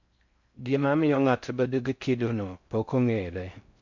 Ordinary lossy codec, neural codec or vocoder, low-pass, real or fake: MP3, 64 kbps; codec, 16 kHz in and 24 kHz out, 0.6 kbps, FocalCodec, streaming, 4096 codes; 7.2 kHz; fake